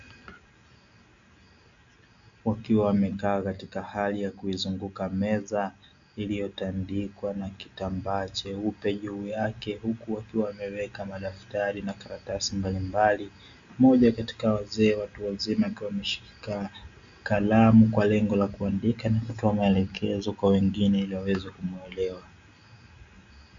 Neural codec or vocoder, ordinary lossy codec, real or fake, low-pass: none; AAC, 64 kbps; real; 7.2 kHz